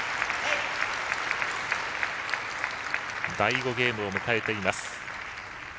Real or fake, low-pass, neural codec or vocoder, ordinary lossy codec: real; none; none; none